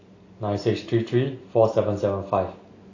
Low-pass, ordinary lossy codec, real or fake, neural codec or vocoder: 7.2 kHz; AAC, 32 kbps; real; none